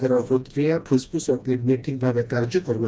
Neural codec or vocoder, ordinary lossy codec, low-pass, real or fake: codec, 16 kHz, 1 kbps, FreqCodec, smaller model; none; none; fake